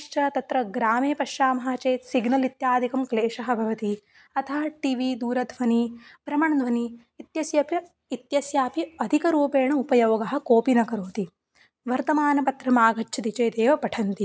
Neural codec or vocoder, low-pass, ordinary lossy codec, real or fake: none; none; none; real